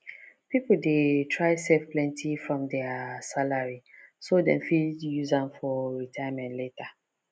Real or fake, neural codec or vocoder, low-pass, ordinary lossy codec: real; none; none; none